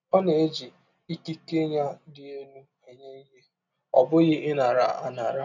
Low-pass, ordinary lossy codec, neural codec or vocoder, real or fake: 7.2 kHz; none; none; real